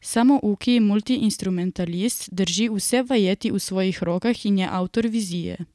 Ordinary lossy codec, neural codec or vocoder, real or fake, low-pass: none; none; real; none